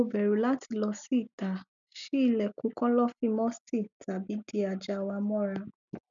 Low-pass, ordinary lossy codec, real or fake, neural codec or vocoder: 7.2 kHz; none; real; none